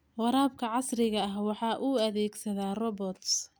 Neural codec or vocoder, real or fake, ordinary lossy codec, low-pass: none; real; none; none